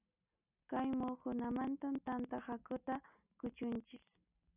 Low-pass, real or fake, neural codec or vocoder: 3.6 kHz; real; none